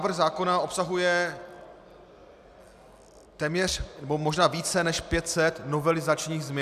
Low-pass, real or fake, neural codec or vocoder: 14.4 kHz; real; none